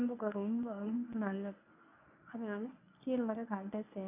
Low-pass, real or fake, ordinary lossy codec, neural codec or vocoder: 3.6 kHz; fake; none; codec, 24 kHz, 0.9 kbps, WavTokenizer, medium speech release version 1